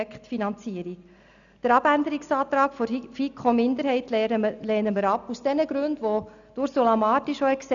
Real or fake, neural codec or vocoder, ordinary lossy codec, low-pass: real; none; none; 7.2 kHz